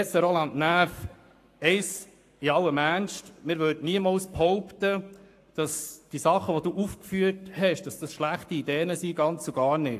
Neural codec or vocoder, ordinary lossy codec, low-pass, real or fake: codec, 44.1 kHz, 7.8 kbps, Pupu-Codec; AAC, 64 kbps; 14.4 kHz; fake